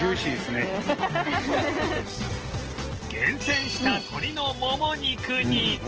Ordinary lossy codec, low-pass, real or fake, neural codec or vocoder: Opus, 16 kbps; 7.2 kHz; real; none